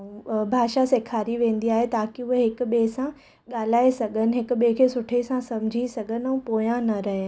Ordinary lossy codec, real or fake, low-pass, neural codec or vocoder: none; real; none; none